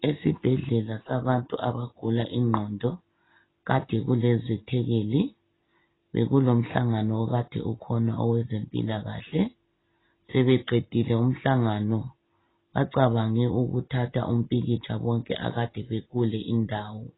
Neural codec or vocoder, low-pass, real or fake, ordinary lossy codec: none; 7.2 kHz; real; AAC, 16 kbps